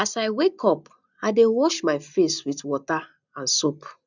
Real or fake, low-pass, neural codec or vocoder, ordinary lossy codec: real; 7.2 kHz; none; none